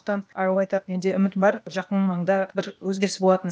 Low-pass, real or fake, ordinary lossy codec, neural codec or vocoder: none; fake; none; codec, 16 kHz, 0.8 kbps, ZipCodec